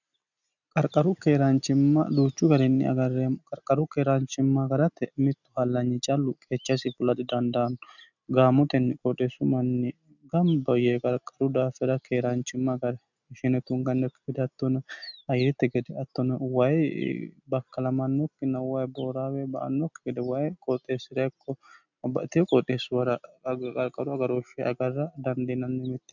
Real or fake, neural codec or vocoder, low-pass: real; none; 7.2 kHz